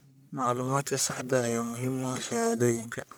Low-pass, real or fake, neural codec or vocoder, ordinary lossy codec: none; fake; codec, 44.1 kHz, 1.7 kbps, Pupu-Codec; none